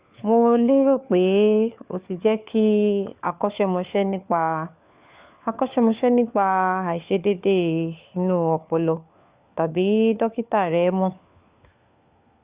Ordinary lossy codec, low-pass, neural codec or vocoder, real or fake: Opus, 64 kbps; 3.6 kHz; codec, 16 kHz, 4 kbps, FunCodec, trained on LibriTTS, 50 frames a second; fake